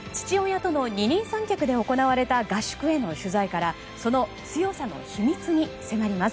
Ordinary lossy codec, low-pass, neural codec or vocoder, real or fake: none; none; none; real